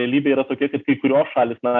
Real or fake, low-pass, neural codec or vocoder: real; 7.2 kHz; none